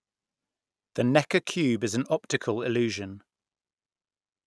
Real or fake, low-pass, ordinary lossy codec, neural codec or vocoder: real; none; none; none